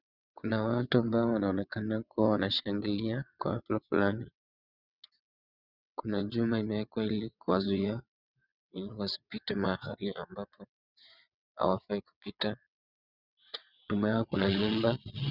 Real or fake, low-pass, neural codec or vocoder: fake; 5.4 kHz; vocoder, 22.05 kHz, 80 mel bands, WaveNeXt